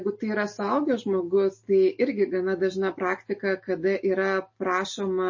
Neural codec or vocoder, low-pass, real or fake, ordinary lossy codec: none; 7.2 kHz; real; MP3, 32 kbps